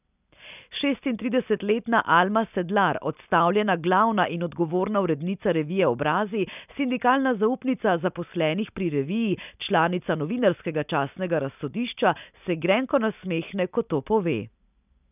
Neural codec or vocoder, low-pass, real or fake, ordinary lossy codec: none; 3.6 kHz; real; none